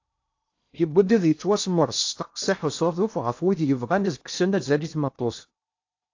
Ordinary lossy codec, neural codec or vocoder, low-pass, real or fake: AAC, 48 kbps; codec, 16 kHz in and 24 kHz out, 0.6 kbps, FocalCodec, streaming, 2048 codes; 7.2 kHz; fake